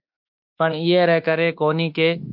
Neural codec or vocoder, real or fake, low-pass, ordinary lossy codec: autoencoder, 48 kHz, 32 numbers a frame, DAC-VAE, trained on Japanese speech; fake; 5.4 kHz; MP3, 48 kbps